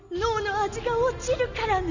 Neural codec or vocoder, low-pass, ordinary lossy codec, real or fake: none; 7.2 kHz; none; real